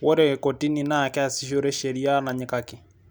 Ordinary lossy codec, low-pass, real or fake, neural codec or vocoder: none; none; real; none